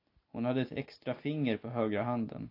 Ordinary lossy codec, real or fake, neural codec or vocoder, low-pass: AAC, 32 kbps; real; none; 5.4 kHz